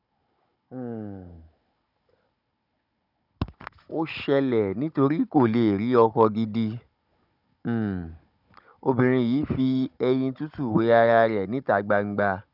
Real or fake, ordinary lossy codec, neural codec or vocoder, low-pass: fake; none; codec, 16 kHz, 16 kbps, FunCodec, trained on Chinese and English, 50 frames a second; 5.4 kHz